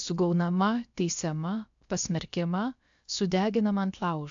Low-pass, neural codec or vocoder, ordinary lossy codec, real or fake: 7.2 kHz; codec, 16 kHz, about 1 kbps, DyCAST, with the encoder's durations; AAC, 64 kbps; fake